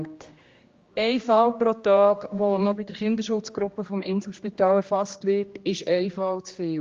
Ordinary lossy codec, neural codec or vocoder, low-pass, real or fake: Opus, 32 kbps; codec, 16 kHz, 1 kbps, X-Codec, HuBERT features, trained on general audio; 7.2 kHz; fake